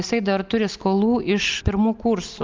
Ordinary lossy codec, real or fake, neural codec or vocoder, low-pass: Opus, 32 kbps; real; none; 7.2 kHz